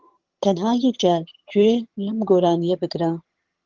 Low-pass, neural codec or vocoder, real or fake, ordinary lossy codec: 7.2 kHz; vocoder, 22.05 kHz, 80 mel bands, HiFi-GAN; fake; Opus, 16 kbps